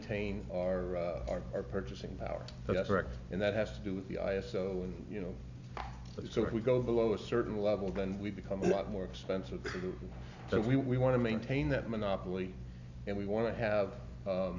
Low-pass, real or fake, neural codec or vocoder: 7.2 kHz; real; none